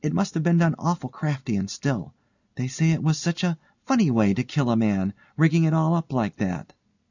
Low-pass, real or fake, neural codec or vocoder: 7.2 kHz; real; none